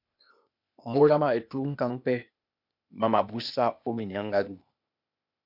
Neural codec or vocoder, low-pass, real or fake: codec, 16 kHz, 0.8 kbps, ZipCodec; 5.4 kHz; fake